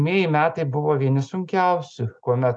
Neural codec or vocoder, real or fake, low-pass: none; real; 9.9 kHz